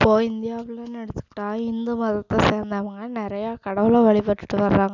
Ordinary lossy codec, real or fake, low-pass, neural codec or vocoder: none; real; 7.2 kHz; none